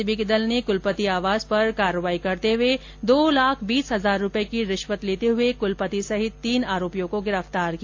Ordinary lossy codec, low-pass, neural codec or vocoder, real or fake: AAC, 48 kbps; 7.2 kHz; none; real